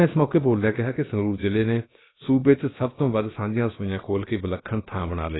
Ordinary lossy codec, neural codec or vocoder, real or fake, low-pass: AAC, 16 kbps; codec, 16 kHz, about 1 kbps, DyCAST, with the encoder's durations; fake; 7.2 kHz